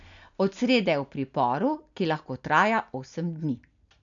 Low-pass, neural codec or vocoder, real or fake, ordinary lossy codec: 7.2 kHz; none; real; none